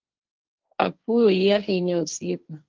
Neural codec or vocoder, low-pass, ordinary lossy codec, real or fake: codec, 16 kHz, 1.1 kbps, Voila-Tokenizer; 7.2 kHz; Opus, 24 kbps; fake